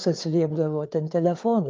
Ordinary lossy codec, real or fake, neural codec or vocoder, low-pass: Opus, 24 kbps; fake; codec, 16 kHz, 4 kbps, FreqCodec, larger model; 7.2 kHz